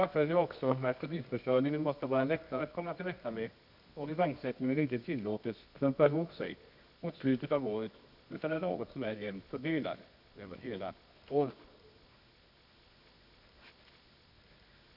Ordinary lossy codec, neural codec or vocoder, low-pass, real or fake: none; codec, 24 kHz, 0.9 kbps, WavTokenizer, medium music audio release; 5.4 kHz; fake